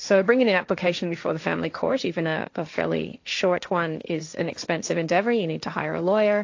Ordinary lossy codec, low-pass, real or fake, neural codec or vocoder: AAC, 48 kbps; 7.2 kHz; fake; codec, 16 kHz, 1.1 kbps, Voila-Tokenizer